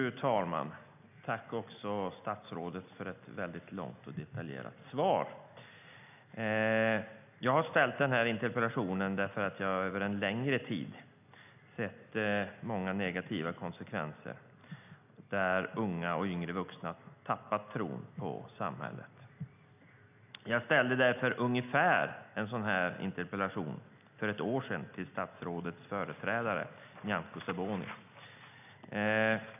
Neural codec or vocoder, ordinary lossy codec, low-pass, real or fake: none; none; 3.6 kHz; real